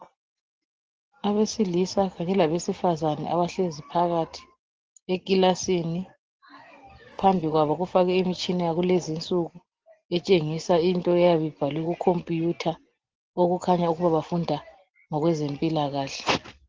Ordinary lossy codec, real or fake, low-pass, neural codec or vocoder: Opus, 16 kbps; real; 7.2 kHz; none